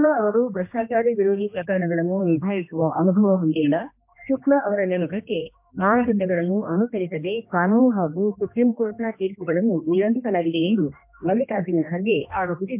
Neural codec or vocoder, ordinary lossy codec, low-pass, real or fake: codec, 16 kHz, 1 kbps, X-Codec, HuBERT features, trained on general audio; MP3, 32 kbps; 3.6 kHz; fake